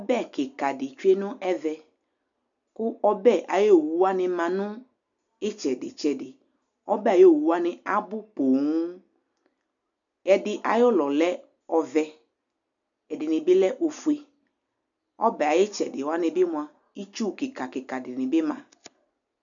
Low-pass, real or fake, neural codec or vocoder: 7.2 kHz; real; none